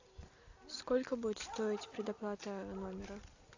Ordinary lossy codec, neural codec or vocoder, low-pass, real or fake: AAC, 48 kbps; none; 7.2 kHz; real